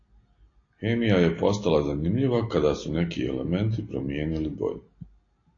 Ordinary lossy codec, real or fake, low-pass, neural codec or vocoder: AAC, 32 kbps; real; 7.2 kHz; none